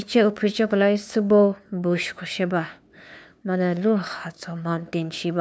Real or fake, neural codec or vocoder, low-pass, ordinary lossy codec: fake; codec, 16 kHz, 2 kbps, FunCodec, trained on LibriTTS, 25 frames a second; none; none